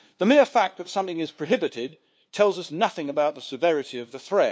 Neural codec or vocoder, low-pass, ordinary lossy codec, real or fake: codec, 16 kHz, 2 kbps, FunCodec, trained on LibriTTS, 25 frames a second; none; none; fake